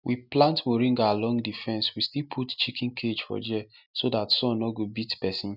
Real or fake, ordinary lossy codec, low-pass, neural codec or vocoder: real; none; 5.4 kHz; none